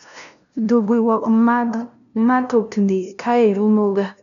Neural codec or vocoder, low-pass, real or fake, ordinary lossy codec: codec, 16 kHz, 0.5 kbps, FunCodec, trained on LibriTTS, 25 frames a second; 7.2 kHz; fake; none